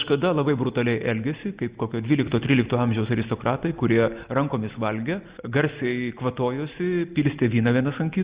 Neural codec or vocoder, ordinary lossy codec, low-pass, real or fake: none; Opus, 64 kbps; 3.6 kHz; real